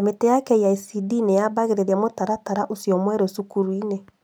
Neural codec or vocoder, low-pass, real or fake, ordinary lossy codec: none; none; real; none